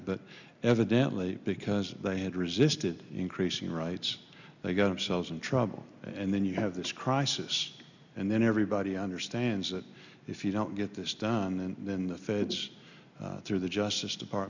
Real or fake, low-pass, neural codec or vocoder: real; 7.2 kHz; none